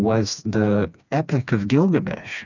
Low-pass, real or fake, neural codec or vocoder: 7.2 kHz; fake; codec, 16 kHz, 2 kbps, FreqCodec, smaller model